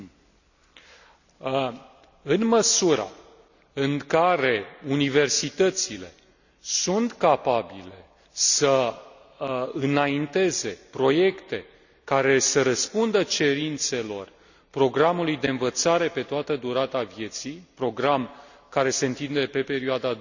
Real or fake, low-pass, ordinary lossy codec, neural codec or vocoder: real; 7.2 kHz; none; none